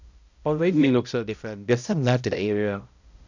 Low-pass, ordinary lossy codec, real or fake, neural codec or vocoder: 7.2 kHz; none; fake; codec, 16 kHz, 0.5 kbps, X-Codec, HuBERT features, trained on balanced general audio